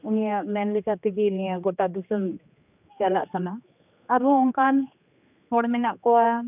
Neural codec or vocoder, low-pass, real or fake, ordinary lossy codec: codec, 16 kHz, 2 kbps, X-Codec, HuBERT features, trained on general audio; 3.6 kHz; fake; none